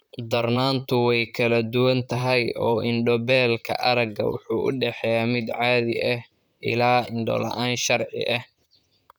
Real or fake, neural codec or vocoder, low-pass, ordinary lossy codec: fake; vocoder, 44.1 kHz, 128 mel bands, Pupu-Vocoder; none; none